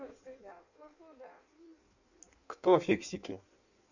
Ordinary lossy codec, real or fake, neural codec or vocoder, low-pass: none; fake; codec, 16 kHz in and 24 kHz out, 1.1 kbps, FireRedTTS-2 codec; 7.2 kHz